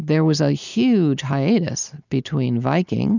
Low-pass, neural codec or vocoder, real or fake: 7.2 kHz; none; real